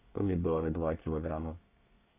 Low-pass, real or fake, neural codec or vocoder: 3.6 kHz; fake; codec, 24 kHz, 1 kbps, SNAC